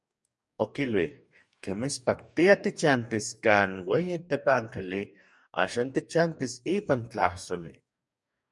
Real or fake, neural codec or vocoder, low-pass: fake; codec, 44.1 kHz, 2.6 kbps, DAC; 10.8 kHz